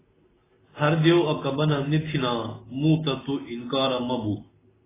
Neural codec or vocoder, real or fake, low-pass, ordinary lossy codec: none; real; 3.6 kHz; AAC, 16 kbps